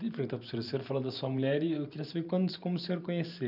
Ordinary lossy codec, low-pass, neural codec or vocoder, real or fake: none; 5.4 kHz; vocoder, 44.1 kHz, 128 mel bands every 512 samples, BigVGAN v2; fake